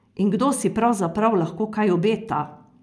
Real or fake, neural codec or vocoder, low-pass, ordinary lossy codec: real; none; none; none